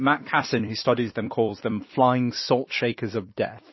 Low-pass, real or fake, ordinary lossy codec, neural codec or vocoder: 7.2 kHz; fake; MP3, 24 kbps; vocoder, 44.1 kHz, 128 mel bands every 256 samples, BigVGAN v2